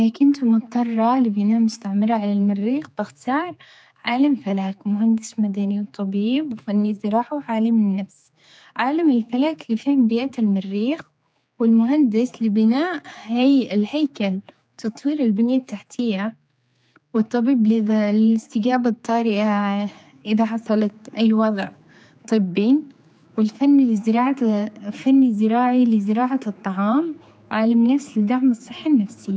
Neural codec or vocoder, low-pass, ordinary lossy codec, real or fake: codec, 16 kHz, 4 kbps, X-Codec, HuBERT features, trained on general audio; none; none; fake